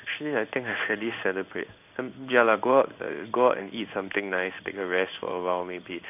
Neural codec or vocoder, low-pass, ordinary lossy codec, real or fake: codec, 16 kHz in and 24 kHz out, 1 kbps, XY-Tokenizer; 3.6 kHz; none; fake